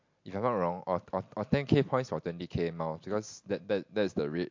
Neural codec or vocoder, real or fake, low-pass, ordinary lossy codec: none; real; 7.2 kHz; MP3, 48 kbps